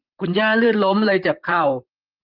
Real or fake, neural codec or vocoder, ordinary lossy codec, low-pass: fake; vocoder, 44.1 kHz, 128 mel bands, Pupu-Vocoder; Opus, 32 kbps; 5.4 kHz